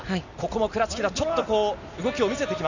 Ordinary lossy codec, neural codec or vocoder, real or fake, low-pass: none; none; real; 7.2 kHz